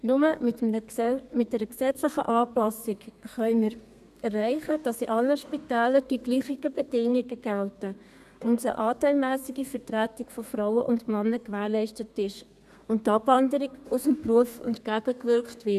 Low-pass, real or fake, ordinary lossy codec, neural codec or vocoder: 14.4 kHz; fake; none; codec, 32 kHz, 1.9 kbps, SNAC